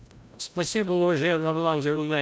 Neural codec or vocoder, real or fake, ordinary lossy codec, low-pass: codec, 16 kHz, 0.5 kbps, FreqCodec, larger model; fake; none; none